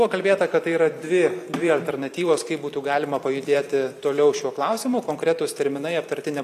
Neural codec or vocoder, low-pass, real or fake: vocoder, 44.1 kHz, 128 mel bands every 512 samples, BigVGAN v2; 14.4 kHz; fake